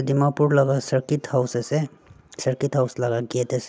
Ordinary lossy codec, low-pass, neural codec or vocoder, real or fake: none; none; none; real